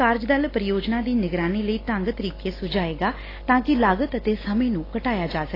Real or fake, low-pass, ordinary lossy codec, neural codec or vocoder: real; 5.4 kHz; AAC, 24 kbps; none